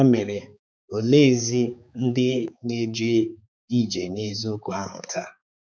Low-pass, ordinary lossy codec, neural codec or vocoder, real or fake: none; none; codec, 16 kHz, 4 kbps, X-Codec, HuBERT features, trained on balanced general audio; fake